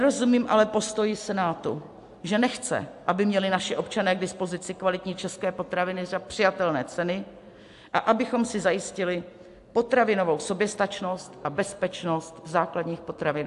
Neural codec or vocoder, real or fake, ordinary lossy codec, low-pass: none; real; AAC, 64 kbps; 10.8 kHz